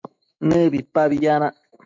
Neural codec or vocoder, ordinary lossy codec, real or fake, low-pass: autoencoder, 48 kHz, 128 numbers a frame, DAC-VAE, trained on Japanese speech; MP3, 48 kbps; fake; 7.2 kHz